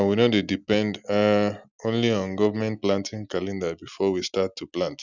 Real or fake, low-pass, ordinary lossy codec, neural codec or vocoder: real; 7.2 kHz; none; none